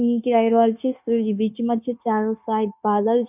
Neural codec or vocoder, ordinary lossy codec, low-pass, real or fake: codec, 16 kHz, 0.9 kbps, LongCat-Audio-Codec; none; 3.6 kHz; fake